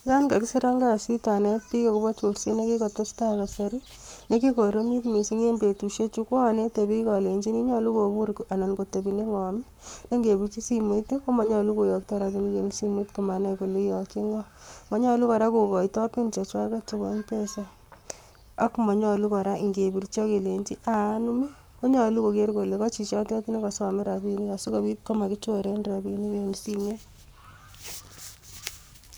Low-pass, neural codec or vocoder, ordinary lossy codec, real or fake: none; codec, 44.1 kHz, 7.8 kbps, Pupu-Codec; none; fake